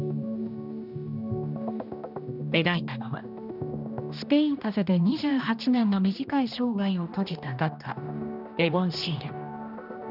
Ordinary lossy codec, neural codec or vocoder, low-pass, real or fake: none; codec, 16 kHz, 1 kbps, X-Codec, HuBERT features, trained on general audio; 5.4 kHz; fake